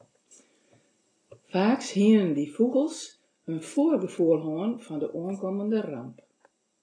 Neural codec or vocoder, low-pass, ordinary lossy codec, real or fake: none; 9.9 kHz; AAC, 32 kbps; real